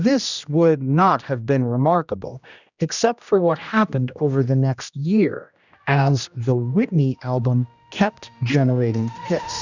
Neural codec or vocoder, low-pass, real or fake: codec, 16 kHz, 1 kbps, X-Codec, HuBERT features, trained on general audio; 7.2 kHz; fake